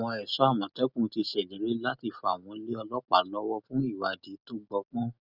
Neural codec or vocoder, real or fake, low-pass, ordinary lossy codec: none; real; 5.4 kHz; none